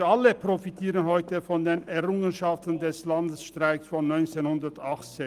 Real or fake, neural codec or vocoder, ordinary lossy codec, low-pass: real; none; Opus, 32 kbps; 14.4 kHz